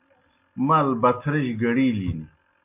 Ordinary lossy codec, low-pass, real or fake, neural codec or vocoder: MP3, 32 kbps; 3.6 kHz; real; none